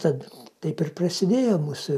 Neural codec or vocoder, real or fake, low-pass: none; real; 14.4 kHz